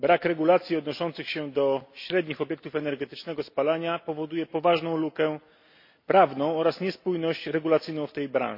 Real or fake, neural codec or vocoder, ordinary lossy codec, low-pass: real; none; MP3, 32 kbps; 5.4 kHz